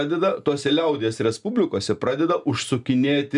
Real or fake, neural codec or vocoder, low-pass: real; none; 10.8 kHz